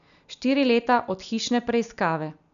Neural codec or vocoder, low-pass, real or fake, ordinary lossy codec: none; 7.2 kHz; real; none